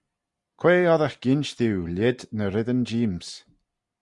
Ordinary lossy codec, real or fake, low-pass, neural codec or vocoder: Opus, 64 kbps; real; 10.8 kHz; none